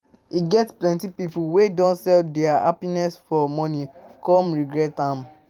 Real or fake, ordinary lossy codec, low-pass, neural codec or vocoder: fake; Opus, 32 kbps; 19.8 kHz; vocoder, 44.1 kHz, 128 mel bands every 512 samples, BigVGAN v2